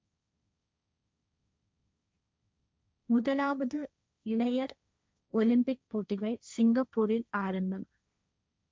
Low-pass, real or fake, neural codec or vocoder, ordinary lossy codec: none; fake; codec, 16 kHz, 1.1 kbps, Voila-Tokenizer; none